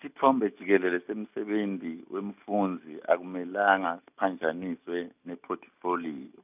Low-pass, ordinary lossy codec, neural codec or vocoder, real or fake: 3.6 kHz; none; none; real